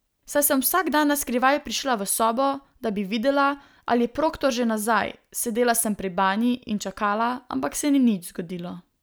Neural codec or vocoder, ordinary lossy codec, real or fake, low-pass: none; none; real; none